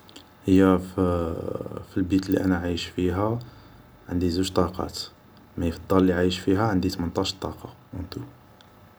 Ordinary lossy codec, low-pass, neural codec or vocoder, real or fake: none; none; none; real